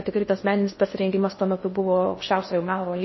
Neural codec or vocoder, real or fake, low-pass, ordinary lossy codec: codec, 16 kHz in and 24 kHz out, 0.6 kbps, FocalCodec, streaming, 2048 codes; fake; 7.2 kHz; MP3, 24 kbps